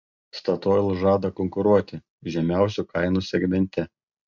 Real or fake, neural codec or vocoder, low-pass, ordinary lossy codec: real; none; 7.2 kHz; MP3, 64 kbps